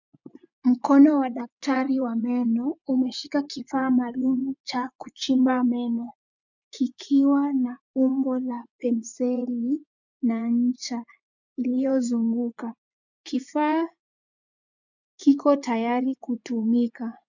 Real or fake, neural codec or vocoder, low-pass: fake; vocoder, 24 kHz, 100 mel bands, Vocos; 7.2 kHz